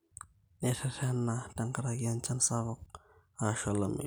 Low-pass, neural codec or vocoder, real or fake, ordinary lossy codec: none; none; real; none